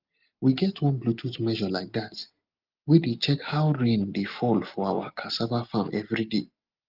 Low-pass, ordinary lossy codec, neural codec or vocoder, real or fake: 5.4 kHz; Opus, 32 kbps; none; real